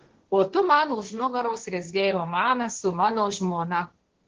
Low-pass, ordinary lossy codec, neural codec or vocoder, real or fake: 7.2 kHz; Opus, 16 kbps; codec, 16 kHz, 1.1 kbps, Voila-Tokenizer; fake